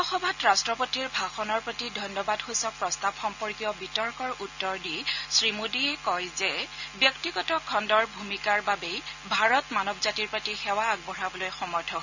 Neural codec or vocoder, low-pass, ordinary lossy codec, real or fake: none; 7.2 kHz; none; real